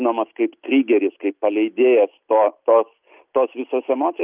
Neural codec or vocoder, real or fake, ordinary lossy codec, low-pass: none; real; Opus, 24 kbps; 3.6 kHz